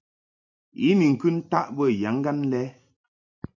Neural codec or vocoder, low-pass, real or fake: vocoder, 44.1 kHz, 128 mel bands every 256 samples, BigVGAN v2; 7.2 kHz; fake